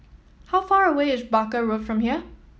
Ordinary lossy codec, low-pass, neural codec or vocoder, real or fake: none; none; none; real